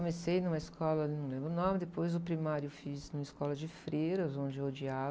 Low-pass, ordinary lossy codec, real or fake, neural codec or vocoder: none; none; real; none